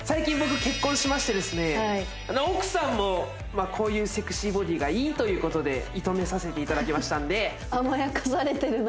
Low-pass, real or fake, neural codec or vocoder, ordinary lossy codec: none; real; none; none